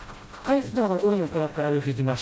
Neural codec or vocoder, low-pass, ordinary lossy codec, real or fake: codec, 16 kHz, 0.5 kbps, FreqCodec, smaller model; none; none; fake